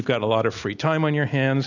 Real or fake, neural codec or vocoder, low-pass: real; none; 7.2 kHz